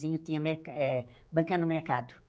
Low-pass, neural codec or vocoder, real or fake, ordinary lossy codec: none; codec, 16 kHz, 4 kbps, X-Codec, HuBERT features, trained on general audio; fake; none